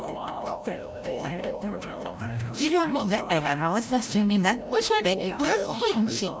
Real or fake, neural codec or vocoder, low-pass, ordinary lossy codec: fake; codec, 16 kHz, 0.5 kbps, FreqCodec, larger model; none; none